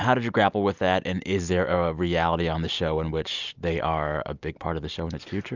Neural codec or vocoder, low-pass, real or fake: none; 7.2 kHz; real